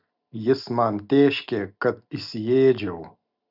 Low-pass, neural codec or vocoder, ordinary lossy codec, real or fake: 5.4 kHz; none; Opus, 64 kbps; real